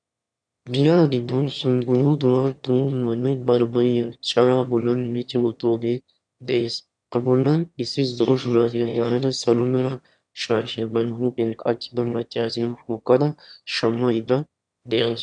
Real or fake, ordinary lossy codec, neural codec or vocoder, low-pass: fake; MP3, 96 kbps; autoencoder, 22.05 kHz, a latent of 192 numbers a frame, VITS, trained on one speaker; 9.9 kHz